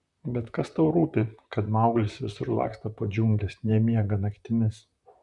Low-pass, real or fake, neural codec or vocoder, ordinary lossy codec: 10.8 kHz; fake; vocoder, 44.1 kHz, 128 mel bands, Pupu-Vocoder; Opus, 64 kbps